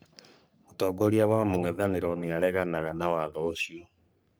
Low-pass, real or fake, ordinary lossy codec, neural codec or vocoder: none; fake; none; codec, 44.1 kHz, 3.4 kbps, Pupu-Codec